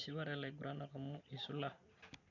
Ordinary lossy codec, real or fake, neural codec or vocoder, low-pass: none; real; none; 7.2 kHz